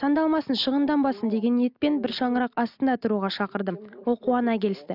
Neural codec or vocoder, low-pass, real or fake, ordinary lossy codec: none; 5.4 kHz; real; none